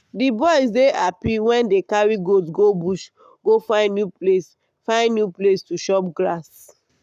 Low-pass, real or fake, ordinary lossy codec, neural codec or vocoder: 14.4 kHz; fake; none; codec, 44.1 kHz, 7.8 kbps, Pupu-Codec